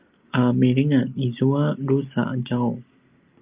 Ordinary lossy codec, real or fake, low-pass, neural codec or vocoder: Opus, 24 kbps; real; 3.6 kHz; none